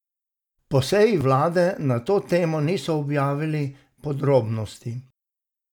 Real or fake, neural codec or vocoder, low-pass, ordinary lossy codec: real; none; 19.8 kHz; none